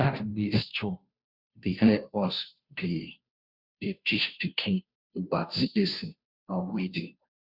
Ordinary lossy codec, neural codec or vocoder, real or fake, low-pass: Opus, 64 kbps; codec, 16 kHz, 0.5 kbps, FunCodec, trained on Chinese and English, 25 frames a second; fake; 5.4 kHz